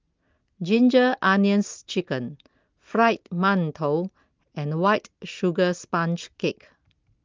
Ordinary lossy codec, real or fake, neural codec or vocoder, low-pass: Opus, 32 kbps; real; none; 7.2 kHz